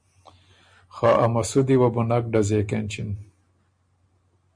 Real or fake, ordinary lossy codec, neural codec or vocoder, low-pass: real; MP3, 64 kbps; none; 9.9 kHz